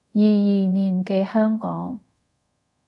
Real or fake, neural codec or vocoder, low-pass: fake; codec, 24 kHz, 0.5 kbps, DualCodec; 10.8 kHz